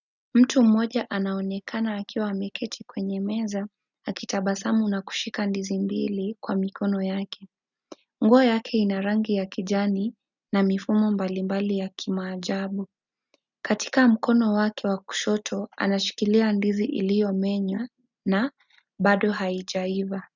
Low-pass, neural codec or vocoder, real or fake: 7.2 kHz; none; real